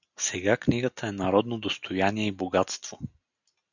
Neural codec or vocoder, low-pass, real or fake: none; 7.2 kHz; real